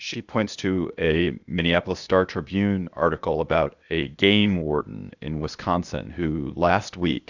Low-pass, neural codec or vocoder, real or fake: 7.2 kHz; codec, 16 kHz, 0.8 kbps, ZipCodec; fake